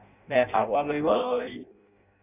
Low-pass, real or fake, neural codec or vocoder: 3.6 kHz; fake; codec, 16 kHz in and 24 kHz out, 0.6 kbps, FireRedTTS-2 codec